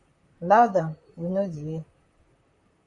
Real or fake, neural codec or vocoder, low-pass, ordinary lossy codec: fake; vocoder, 44.1 kHz, 128 mel bands, Pupu-Vocoder; 10.8 kHz; AAC, 64 kbps